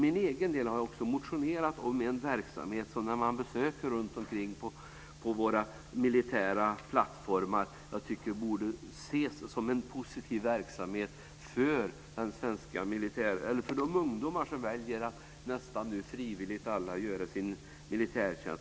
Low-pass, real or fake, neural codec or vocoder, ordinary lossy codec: none; real; none; none